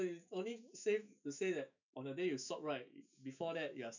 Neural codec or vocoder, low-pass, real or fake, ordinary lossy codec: autoencoder, 48 kHz, 128 numbers a frame, DAC-VAE, trained on Japanese speech; 7.2 kHz; fake; none